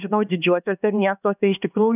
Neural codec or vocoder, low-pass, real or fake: codec, 16 kHz, 2 kbps, X-Codec, HuBERT features, trained on LibriSpeech; 3.6 kHz; fake